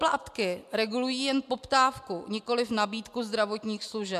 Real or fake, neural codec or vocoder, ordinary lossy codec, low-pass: real; none; MP3, 96 kbps; 14.4 kHz